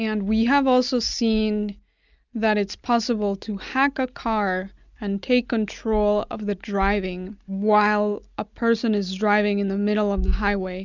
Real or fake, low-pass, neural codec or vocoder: real; 7.2 kHz; none